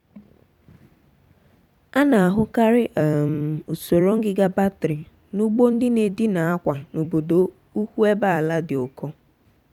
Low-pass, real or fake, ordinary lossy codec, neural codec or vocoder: 19.8 kHz; fake; none; vocoder, 44.1 kHz, 128 mel bands every 256 samples, BigVGAN v2